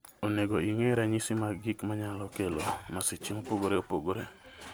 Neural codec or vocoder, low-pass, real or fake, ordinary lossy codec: none; none; real; none